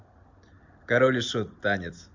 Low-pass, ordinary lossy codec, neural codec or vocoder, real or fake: 7.2 kHz; MP3, 64 kbps; none; real